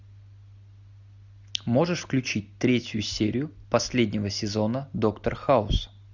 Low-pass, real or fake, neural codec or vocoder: 7.2 kHz; real; none